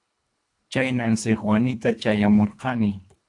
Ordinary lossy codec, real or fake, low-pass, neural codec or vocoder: AAC, 64 kbps; fake; 10.8 kHz; codec, 24 kHz, 1.5 kbps, HILCodec